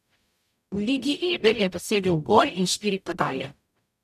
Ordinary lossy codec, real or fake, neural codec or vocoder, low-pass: none; fake; codec, 44.1 kHz, 0.9 kbps, DAC; 14.4 kHz